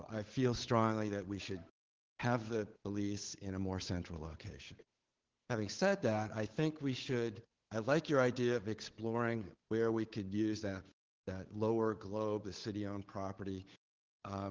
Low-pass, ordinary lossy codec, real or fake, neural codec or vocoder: 7.2 kHz; Opus, 16 kbps; fake; codec, 16 kHz, 8 kbps, FunCodec, trained on Chinese and English, 25 frames a second